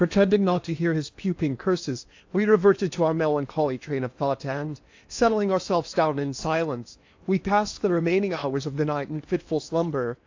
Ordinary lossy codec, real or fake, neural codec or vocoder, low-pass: AAC, 48 kbps; fake; codec, 16 kHz in and 24 kHz out, 0.8 kbps, FocalCodec, streaming, 65536 codes; 7.2 kHz